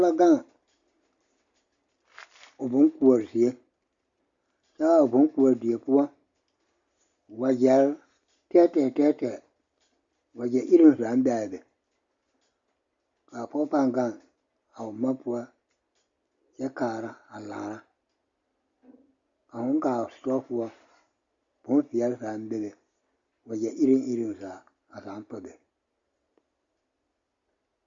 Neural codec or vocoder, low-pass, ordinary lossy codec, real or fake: none; 7.2 kHz; Opus, 64 kbps; real